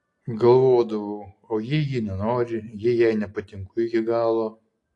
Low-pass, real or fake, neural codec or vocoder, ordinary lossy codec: 10.8 kHz; real; none; AAC, 48 kbps